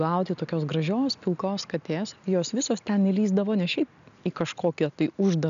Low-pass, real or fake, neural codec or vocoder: 7.2 kHz; real; none